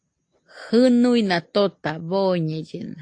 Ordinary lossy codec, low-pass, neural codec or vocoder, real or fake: AAC, 48 kbps; 9.9 kHz; none; real